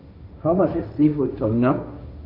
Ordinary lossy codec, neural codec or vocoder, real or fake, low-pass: none; codec, 16 kHz, 1.1 kbps, Voila-Tokenizer; fake; 5.4 kHz